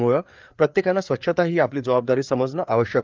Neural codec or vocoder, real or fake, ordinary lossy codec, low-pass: codec, 16 kHz, 4 kbps, FreqCodec, larger model; fake; Opus, 32 kbps; 7.2 kHz